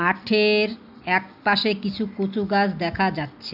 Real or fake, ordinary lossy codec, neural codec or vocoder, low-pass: real; none; none; 5.4 kHz